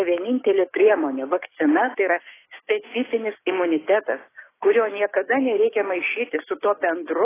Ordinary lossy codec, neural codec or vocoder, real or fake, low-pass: AAC, 16 kbps; codec, 16 kHz, 6 kbps, DAC; fake; 3.6 kHz